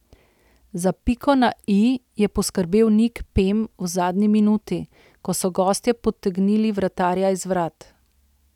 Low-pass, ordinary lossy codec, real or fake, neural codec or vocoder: 19.8 kHz; none; real; none